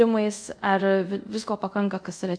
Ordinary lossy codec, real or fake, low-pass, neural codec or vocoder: MP3, 64 kbps; fake; 9.9 kHz; codec, 24 kHz, 0.5 kbps, DualCodec